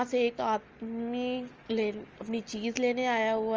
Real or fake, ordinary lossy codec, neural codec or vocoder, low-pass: real; Opus, 24 kbps; none; 7.2 kHz